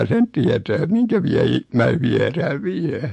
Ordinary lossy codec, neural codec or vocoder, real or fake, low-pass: MP3, 48 kbps; autoencoder, 48 kHz, 128 numbers a frame, DAC-VAE, trained on Japanese speech; fake; 14.4 kHz